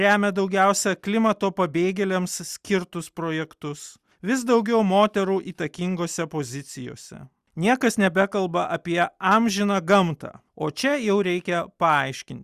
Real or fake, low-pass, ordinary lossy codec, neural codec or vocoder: real; 14.4 kHz; Opus, 64 kbps; none